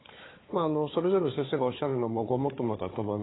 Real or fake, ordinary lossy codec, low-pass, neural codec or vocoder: fake; AAC, 16 kbps; 7.2 kHz; codec, 16 kHz, 4 kbps, FunCodec, trained on Chinese and English, 50 frames a second